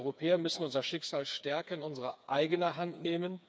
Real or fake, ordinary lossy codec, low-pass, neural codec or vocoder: fake; none; none; codec, 16 kHz, 4 kbps, FreqCodec, smaller model